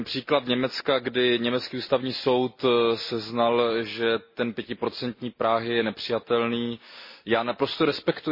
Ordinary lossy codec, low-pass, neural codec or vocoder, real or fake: MP3, 24 kbps; 5.4 kHz; none; real